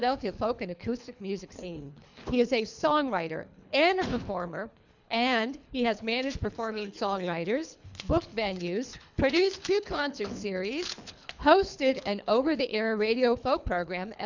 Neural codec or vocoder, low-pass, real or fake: codec, 24 kHz, 3 kbps, HILCodec; 7.2 kHz; fake